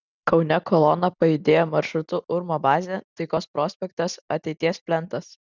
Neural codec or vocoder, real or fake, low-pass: none; real; 7.2 kHz